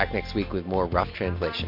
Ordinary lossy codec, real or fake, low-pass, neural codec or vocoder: MP3, 32 kbps; real; 5.4 kHz; none